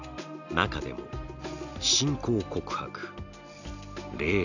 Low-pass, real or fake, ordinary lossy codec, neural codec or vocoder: 7.2 kHz; real; none; none